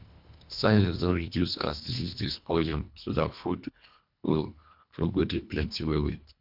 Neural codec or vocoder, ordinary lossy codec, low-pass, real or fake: codec, 24 kHz, 1.5 kbps, HILCodec; MP3, 48 kbps; 5.4 kHz; fake